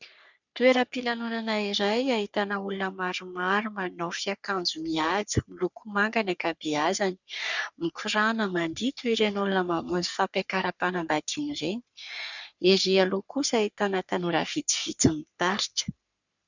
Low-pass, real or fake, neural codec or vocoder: 7.2 kHz; fake; codec, 44.1 kHz, 3.4 kbps, Pupu-Codec